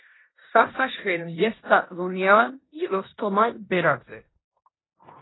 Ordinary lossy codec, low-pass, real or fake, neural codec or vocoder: AAC, 16 kbps; 7.2 kHz; fake; codec, 16 kHz in and 24 kHz out, 0.9 kbps, LongCat-Audio-Codec, four codebook decoder